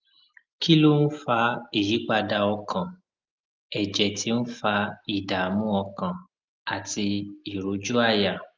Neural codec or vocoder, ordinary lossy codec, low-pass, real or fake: none; Opus, 24 kbps; 7.2 kHz; real